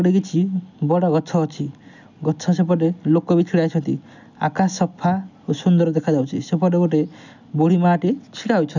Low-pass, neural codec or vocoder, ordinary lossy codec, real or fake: 7.2 kHz; none; none; real